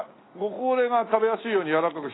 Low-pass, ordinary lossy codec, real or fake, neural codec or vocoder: 7.2 kHz; AAC, 16 kbps; real; none